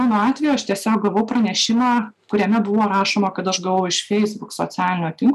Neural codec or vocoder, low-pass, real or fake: none; 14.4 kHz; real